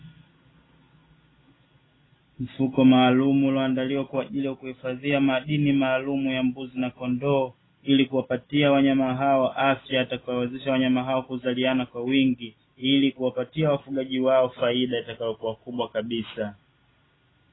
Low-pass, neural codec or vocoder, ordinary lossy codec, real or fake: 7.2 kHz; none; AAC, 16 kbps; real